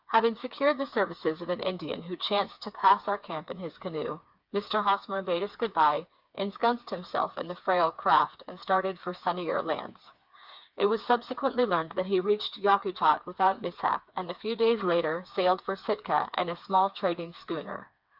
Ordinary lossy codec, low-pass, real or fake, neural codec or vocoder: Opus, 64 kbps; 5.4 kHz; fake; codec, 16 kHz, 4 kbps, FreqCodec, smaller model